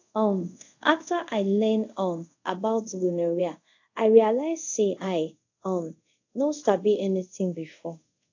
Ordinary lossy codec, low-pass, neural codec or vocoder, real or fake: AAC, 48 kbps; 7.2 kHz; codec, 24 kHz, 0.5 kbps, DualCodec; fake